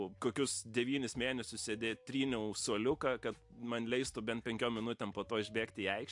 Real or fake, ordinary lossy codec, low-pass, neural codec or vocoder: real; MP3, 64 kbps; 10.8 kHz; none